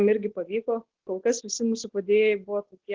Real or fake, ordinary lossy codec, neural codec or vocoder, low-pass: real; Opus, 16 kbps; none; 7.2 kHz